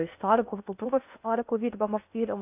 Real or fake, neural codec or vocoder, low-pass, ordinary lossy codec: fake; codec, 16 kHz in and 24 kHz out, 0.6 kbps, FocalCodec, streaming, 2048 codes; 3.6 kHz; AAC, 32 kbps